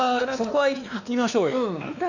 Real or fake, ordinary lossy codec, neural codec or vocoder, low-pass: fake; none; codec, 16 kHz, 2 kbps, X-Codec, WavLM features, trained on Multilingual LibriSpeech; 7.2 kHz